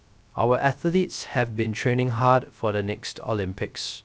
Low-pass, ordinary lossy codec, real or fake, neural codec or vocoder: none; none; fake; codec, 16 kHz, 0.3 kbps, FocalCodec